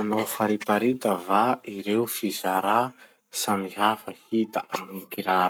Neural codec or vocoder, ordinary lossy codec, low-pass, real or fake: codec, 44.1 kHz, 7.8 kbps, Pupu-Codec; none; none; fake